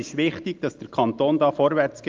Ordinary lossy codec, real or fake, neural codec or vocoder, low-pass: Opus, 32 kbps; real; none; 7.2 kHz